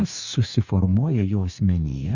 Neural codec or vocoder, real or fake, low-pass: codec, 44.1 kHz, 2.6 kbps, DAC; fake; 7.2 kHz